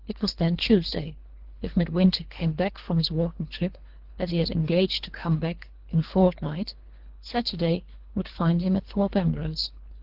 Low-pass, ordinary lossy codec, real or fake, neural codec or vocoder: 5.4 kHz; Opus, 16 kbps; fake; codec, 24 kHz, 3 kbps, HILCodec